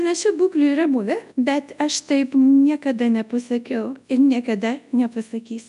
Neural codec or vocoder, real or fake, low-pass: codec, 24 kHz, 0.9 kbps, WavTokenizer, large speech release; fake; 10.8 kHz